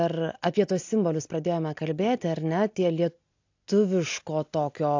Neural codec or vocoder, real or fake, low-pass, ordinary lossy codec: none; real; 7.2 kHz; AAC, 48 kbps